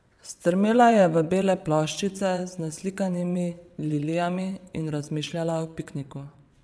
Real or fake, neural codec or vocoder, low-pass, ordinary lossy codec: fake; vocoder, 22.05 kHz, 80 mel bands, WaveNeXt; none; none